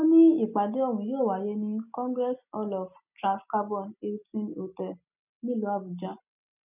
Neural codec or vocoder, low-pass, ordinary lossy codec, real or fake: none; 3.6 kHz; none; real